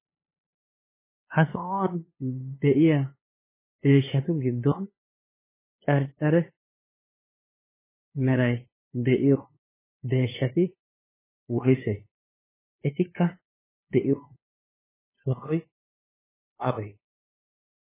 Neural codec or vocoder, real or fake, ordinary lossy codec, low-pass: codec, 16 kHz, 2 kbps, FunCodec, trained on LibriTTS, 25 frames a second; fake; MP3, 16 kbps; 3.6 kHz